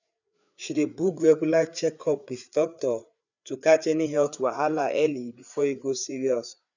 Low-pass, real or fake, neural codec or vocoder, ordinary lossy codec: 7.2 kHz; fake; codec, 16 kHz, 4 kbps, FreqCodec, larger model; none